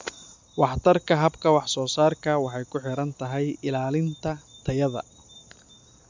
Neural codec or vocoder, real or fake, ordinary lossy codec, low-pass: none; real; MP3, 64 kbps; 7.2 kHz